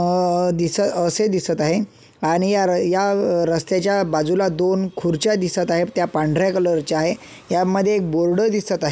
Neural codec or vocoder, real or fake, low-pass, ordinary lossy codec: none; real; none; none